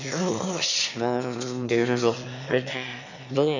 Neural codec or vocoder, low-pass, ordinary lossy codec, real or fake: autoencoder, 22.05 kHz, a latent of 192 numbers a frame, VITS, trained on one speaker; 7.2 kHz; none; fake